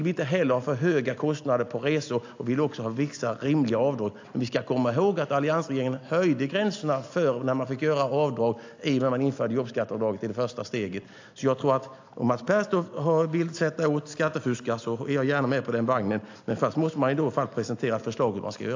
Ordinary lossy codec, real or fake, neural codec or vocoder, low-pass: AAC, 48 kbps; real; none; 7.2 kHz